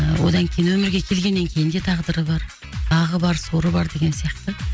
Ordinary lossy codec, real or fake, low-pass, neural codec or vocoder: none; real; none; none